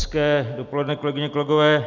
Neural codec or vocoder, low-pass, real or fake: none; 7.2 kHz; real